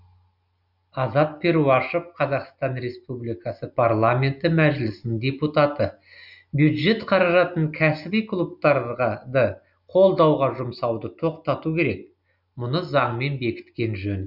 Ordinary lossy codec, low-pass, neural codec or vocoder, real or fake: none; 5.4 kHz; none; real